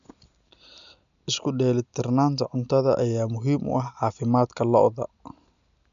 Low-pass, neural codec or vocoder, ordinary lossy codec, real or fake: 7.2 kHz; none; none; real